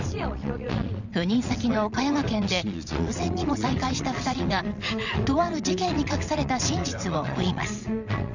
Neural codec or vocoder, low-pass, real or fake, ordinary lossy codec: vocoder, 22.05 kHz, 80 mel bands, WaveNeXt; 7.2 kHz; fake; none